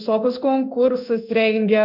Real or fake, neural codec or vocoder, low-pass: fake; codec, 16 kHz in and 24 kHz out, 1 kbps, XY-Tokenizer; 5.4 kHz